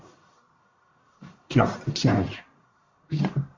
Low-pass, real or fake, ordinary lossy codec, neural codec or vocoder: 7.2 kHz; fake; MP3, 48 kbps; codec, 44.1 kHz, 1.7 kbps, Pupu-Codec